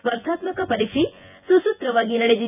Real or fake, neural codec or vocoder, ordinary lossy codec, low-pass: fake; vocoder, 24 kHz, 100 mel bands, Vocos; none; 3.6 kHz